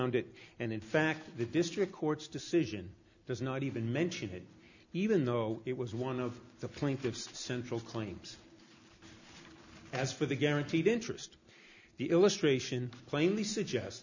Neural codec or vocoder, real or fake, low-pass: none; real; 7.2 kHz